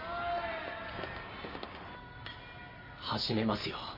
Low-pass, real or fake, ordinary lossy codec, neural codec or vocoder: 5.4 kHz; real; MP3, 24 kbps; none